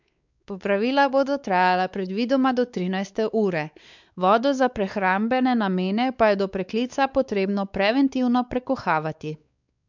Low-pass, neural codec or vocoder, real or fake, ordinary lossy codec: 7.2 kHz; codec, 16 kHz, 4 kbps, X-Codec, WavLM features, trained on Multilingual LibriSpeech; fake; none